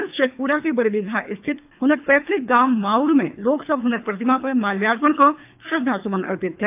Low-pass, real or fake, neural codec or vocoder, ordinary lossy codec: 3.6 kHz; fake; codec, 24 kHz, 3 kbps, HILCodec; AAC, 32 kbps